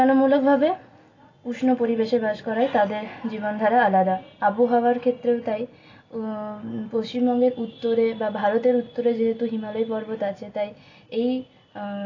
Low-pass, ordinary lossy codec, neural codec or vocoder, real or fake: 7.2 kHz; AAC, 32 kbps; none; real